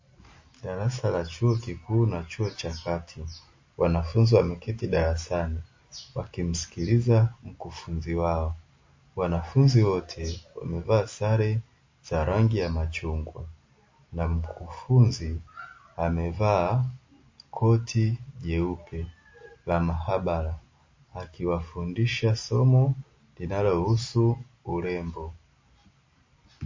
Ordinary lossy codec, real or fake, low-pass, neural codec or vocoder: MP3, 32 kbps; real; 7.2 kHz; none